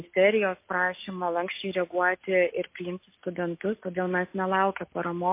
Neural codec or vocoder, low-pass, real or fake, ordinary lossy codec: none; 3.6 kHz; real; MP3, 24 kbps